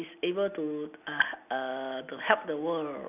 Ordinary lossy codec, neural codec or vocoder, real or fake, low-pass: none; none; real; 3.6 kHz